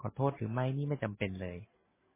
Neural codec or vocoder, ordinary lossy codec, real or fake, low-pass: none; MP3, 16 kbps; real; 3.6 kHz